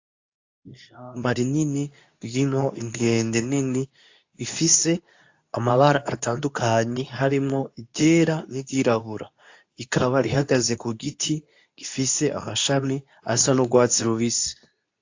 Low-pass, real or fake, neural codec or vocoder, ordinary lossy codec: 7.2 kHz; fake; codec, 24 kHz, 0.9 kbps, WavTokenizer, medium speech release version 2; AAC, 48 kbps